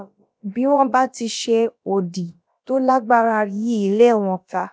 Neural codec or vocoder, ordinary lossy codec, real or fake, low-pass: codec, 16 kHz, about 1 kbps, DyCAST, with the encoder's durations; none; fake; none